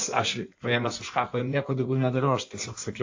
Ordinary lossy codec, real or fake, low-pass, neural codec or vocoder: AAC, 48 kbps; fake; 7.2 kHz; codec, 16 kHz in and 24 kHz out, 1.1 kbps, FireRedTTS-2 codec